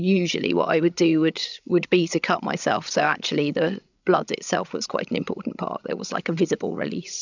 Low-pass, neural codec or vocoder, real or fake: 7.2 kHz; codec, 16 kHz, 16 kbps, FreqCodec, larger model; fake